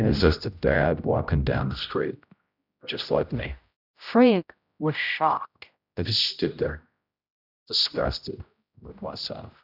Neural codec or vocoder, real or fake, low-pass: codec, 16 kHz, 0.5 kbps, X-Codec, HuBERT features, trained on general audio; fake; 5.4 kHz